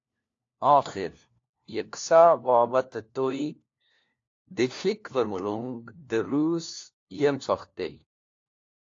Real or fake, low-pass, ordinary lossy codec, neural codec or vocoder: fake; 7.2 kHz; AAC, 48 kbps; codec, 16 kHz, 1 kbps, FunCodec, trained on LibriTTS, 50 frames a second